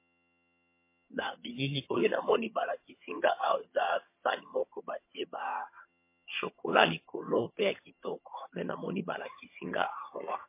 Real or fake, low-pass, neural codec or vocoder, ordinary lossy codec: fake; 3.6 kHz; vocoder, 22.05 kHz, 80 mel bands, HiFi-GAN; MP3, 24 kbps